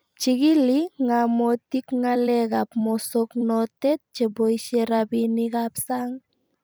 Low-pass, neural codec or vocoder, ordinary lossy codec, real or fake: none; vocoder, 44.1 kHz, 128 mel bands every 256 samples, BigVGAN v2; none; fake